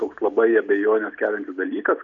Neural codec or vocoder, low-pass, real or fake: none; 7.2 kHz; real